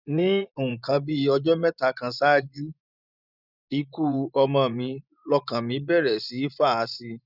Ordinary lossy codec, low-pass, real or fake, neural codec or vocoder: none; 5.4 kHz; fake; vocoder, 24 kHz, 100 mel bands, Vocos